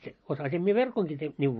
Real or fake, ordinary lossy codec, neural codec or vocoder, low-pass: real; MP3, 32 kbps; none; 10.8 kHz